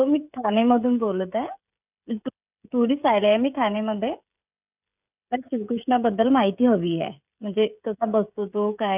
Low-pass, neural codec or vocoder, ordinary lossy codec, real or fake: 3.6 kHz; codec, 16 kHz, 16 kbps, FreqCodec, smaller model; none; fake